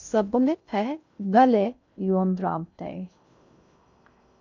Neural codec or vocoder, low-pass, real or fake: codec, 16 kHz in and 24 kHz out, 0.6 kbps, FocalCodec, streaming, 2048 codes; 7.2 kHz; fake